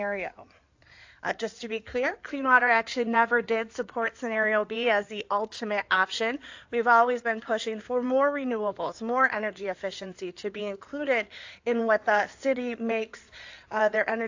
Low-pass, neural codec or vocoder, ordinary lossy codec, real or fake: 7.2 kHz; codec, 16 kHz in and 24 kHz out, 2.2 kbps, FireRedTTS-2 codec; AAC, 48 kbps; fake